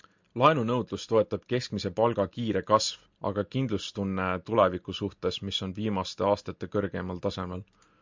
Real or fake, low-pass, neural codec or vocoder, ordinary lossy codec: real; 7.2 kHz; none; MP3, 64 kbps